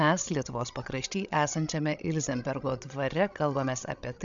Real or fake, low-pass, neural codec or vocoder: fake; 7.2 kHz; codec, 16 kHz, 16 kbps, FreqCodec, larger model